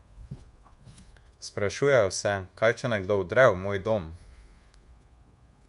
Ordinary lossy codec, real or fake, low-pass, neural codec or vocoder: MP3, 64 kbps; fake; 10.8 kHz; codec, 24 kHz, 1.2 kbps, DualCodec